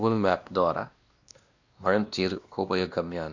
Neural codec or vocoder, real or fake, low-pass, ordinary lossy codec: codec, 16 kHz, 1 kbps, X-Codec, WavLM features, trained on Multilingual LibriSpeech; fake; 7.2 kHz; Opus, 64 kbps